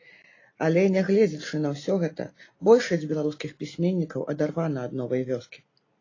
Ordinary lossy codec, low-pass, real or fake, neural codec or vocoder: AAC, 32 kbps; 7.2 kHz; real; none